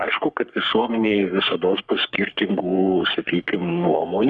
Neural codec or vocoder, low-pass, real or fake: codec, 44.1 kHz, 3.4 kbps, Pupu-Codec; 10.8 kHz; fake